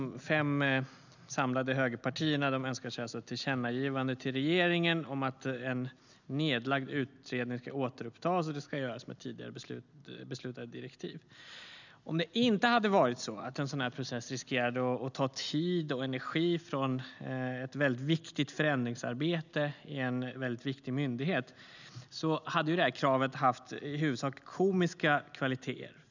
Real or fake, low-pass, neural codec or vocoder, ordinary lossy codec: real; 7.2 kHz; none; none